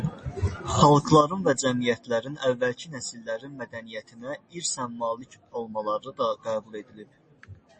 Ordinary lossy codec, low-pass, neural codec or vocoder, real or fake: MP3, 32 kbps; 10.8 kHz; none; real